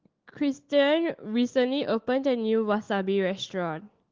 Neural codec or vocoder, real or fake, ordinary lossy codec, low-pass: autoencoder, 48 kHz, 128 numbers a frame, DAC-VAE, trained on Japanese speech; fake; Opus, 16 kbps; 7.2 kHz